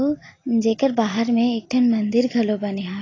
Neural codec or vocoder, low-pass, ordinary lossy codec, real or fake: none; 7.2 kHz; AAC, 32 kbps; real